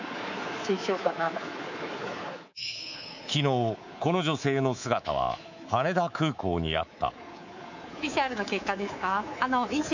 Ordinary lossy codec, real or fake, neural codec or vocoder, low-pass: none; fake; codec, 24 kHz, 3.1 kbps, DualCodec; 7.2 kHz